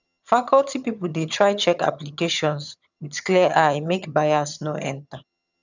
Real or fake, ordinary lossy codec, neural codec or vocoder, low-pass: fake; none; vocoder, 22.05 kHz, 80 mel bands, HiFi-GAN; 7.2 kHz